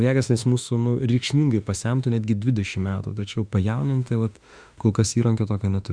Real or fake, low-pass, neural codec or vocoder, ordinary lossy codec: fake; 9.9 kHz; autoencoder, 48 kHz, 32 numbers a frame, DAC-VAE, trained on Japanese speech; Opus, 64 kbps